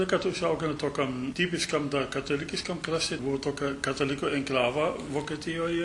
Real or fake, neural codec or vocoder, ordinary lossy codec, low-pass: real; none; MP3, 64 kbps; 10.8 kHz